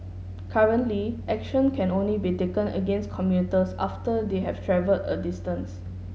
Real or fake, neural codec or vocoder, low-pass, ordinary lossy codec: real; none; none; none